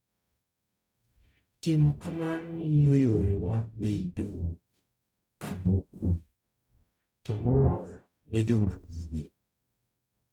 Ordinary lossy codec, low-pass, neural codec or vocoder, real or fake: none; 19.8 kHz; codec, 44.1 kHz, 0.9 kbps, DAC; fake